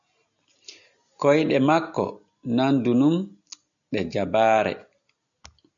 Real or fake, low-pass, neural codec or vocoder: real; 7.2 kHz; none